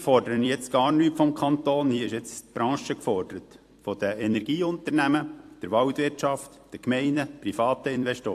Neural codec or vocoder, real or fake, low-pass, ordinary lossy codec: vocoder, 44.1 kHz, 128 mel bands every 256 samples, BigVGAN v2; fake; 14.4 kHz; AAC, 64 kbps